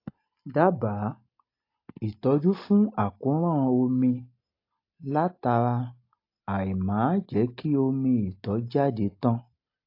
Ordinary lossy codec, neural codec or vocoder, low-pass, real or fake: AAC, 32 kbps; none; 5.4 kHz; real